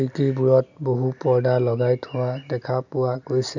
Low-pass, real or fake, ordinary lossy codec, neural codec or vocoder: 7.2 kHz; real; none; none